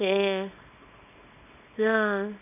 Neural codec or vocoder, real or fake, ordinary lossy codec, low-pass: codec, 24 kHz, 0.9 kbps, WavTokenizer, small release; fake; none; 3.6 kHz